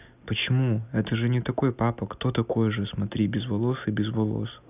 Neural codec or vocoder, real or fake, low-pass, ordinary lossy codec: none; real; 3.6 kHz; none